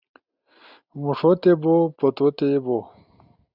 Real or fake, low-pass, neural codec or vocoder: real; 5.4 kHz; none